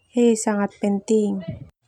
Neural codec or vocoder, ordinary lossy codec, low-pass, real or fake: none; MP3, 96 kbps; 19.8 kHz; real